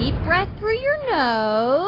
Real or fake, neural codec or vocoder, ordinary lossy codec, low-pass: real; none; AAC, 24 kbps; 5.4 kHz